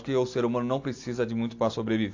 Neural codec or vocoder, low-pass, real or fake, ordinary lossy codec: none; 7.2 kHz; real; AAC, 48 kbps